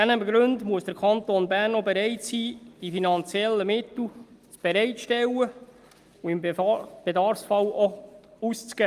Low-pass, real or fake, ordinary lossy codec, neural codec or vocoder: 14.4 kHz; real; Opus, 32 kbps; none